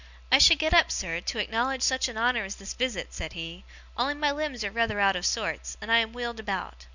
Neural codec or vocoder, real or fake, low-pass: none; real; 7.2 kHz